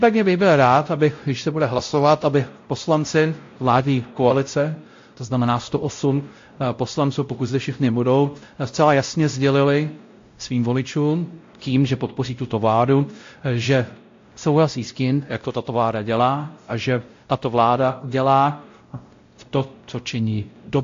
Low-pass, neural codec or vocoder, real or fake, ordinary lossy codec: 7.2 kHz; codec, 16 kHz, 0.5 kbps, X-Codec, WavLM features, trained on Multilingual LibriSpeech; fake; AAC, 48 kbps